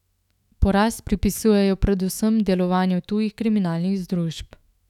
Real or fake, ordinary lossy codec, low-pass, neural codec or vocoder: fake; none; 19.8 kHz; autoencoder, 48 kHz, 128 numbers a frame, DAC-VAE, trained on Japanese speech